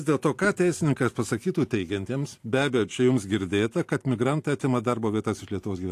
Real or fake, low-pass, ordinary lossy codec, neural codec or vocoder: fake; 14.4 kHz; AAC, 64 kbps; vocoder, 44.1 kHz, 128 mel bands every 512 samples, BigVGAN v2